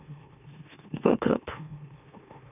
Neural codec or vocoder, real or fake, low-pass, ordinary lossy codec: autoencoder, 44.1 kHz, a latent of 192 numbers a frame, MeloTTS; fake; 3.6 kHz; none